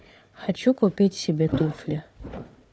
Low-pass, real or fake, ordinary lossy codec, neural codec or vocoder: none; fake; none; codec, 16 kHz, 4 kbps, FunCodec, trained on Chinese and English, 50 frames a second